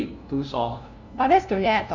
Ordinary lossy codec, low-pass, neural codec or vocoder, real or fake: none; 7.2 kHz; codec, 16 kHz, 0.5 kbps, FunCodec, trained on LibriTTS, 25 frames a second; fake